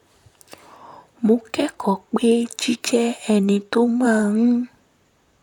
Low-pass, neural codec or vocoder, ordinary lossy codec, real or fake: 19.8 kHz; vocoder, 44.1 kHz, 128 mel bands, Pupu-Vocoder; none; fake